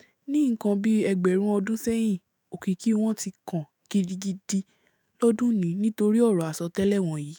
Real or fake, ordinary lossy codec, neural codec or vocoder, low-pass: fake; none; autoencoder, 48 kHz, 128 numbers a frame, DAC-VAE, trained on Japanese speech; none